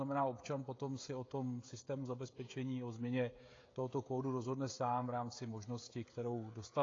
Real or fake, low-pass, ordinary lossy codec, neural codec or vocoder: fake; 7.2 kHz; AAC, 32 kbps; codec, 16 kHz, 16 kbps, FreqCodec, smaller model